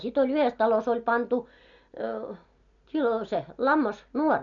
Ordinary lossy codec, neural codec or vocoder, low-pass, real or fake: none; none; 7.2 kHz; real